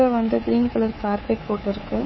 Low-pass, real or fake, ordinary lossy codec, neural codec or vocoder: 7.2 kHz; fake; MP3, 24 kbps; codec, 24 kHz, 3.1 kbps, DualCodec